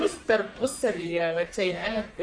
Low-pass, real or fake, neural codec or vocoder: 9.9 kHz; fake; codec, 44.1 kHz, 1.7 kbps, Pupu-Codec